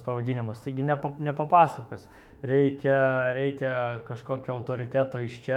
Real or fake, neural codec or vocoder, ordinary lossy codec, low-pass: fake; autoencoder, 48 kHz, 32 numbers a frame, DAC-VAE, trained on Japanese speech; MP3, 96 kbps; 19.8 kHz